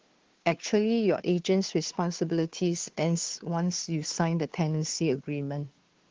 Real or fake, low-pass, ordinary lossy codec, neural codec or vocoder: fake; 7.2 kHz; Opus, 16 kbps; codec, 16 kHz, 2 kbps, FunCodec, trained on Chinese and English, 25 frames a second